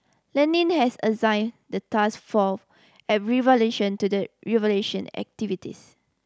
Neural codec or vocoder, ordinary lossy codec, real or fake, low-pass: none; none; real; none